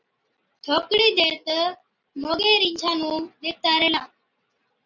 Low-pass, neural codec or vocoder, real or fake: 7.2 kHz; none; real